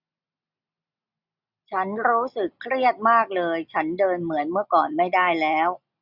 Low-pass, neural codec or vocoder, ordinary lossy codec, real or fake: 5.4 kHz; none; none; real